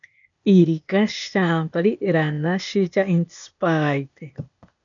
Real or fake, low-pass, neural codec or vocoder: fake; 7.2 kHz; codec, 16 kHz, 0.8 kbps, ZipCodec